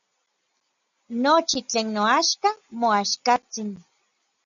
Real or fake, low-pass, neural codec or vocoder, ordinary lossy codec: real; 7.2 kHz; none; MP3, 96 kbps